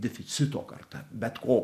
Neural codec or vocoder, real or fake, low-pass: none; real; 14.4 kHz